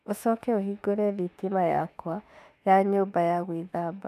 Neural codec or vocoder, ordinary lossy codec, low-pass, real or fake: autoencoder, 48 kHz, 32 numbers a frame, DAC-VAE, trained on Japanese speech; none; 14.4 kHz; fake